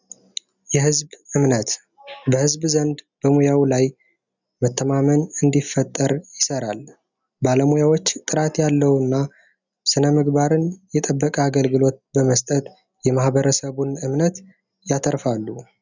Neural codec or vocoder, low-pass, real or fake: none; 7.2 kHz; real